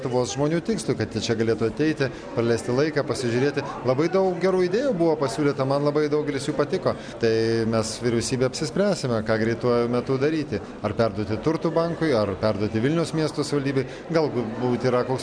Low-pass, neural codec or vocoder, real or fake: 9.9 kHz; none; real